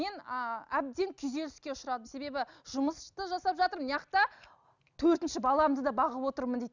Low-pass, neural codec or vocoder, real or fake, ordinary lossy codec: 7.2 kHz; none; real; none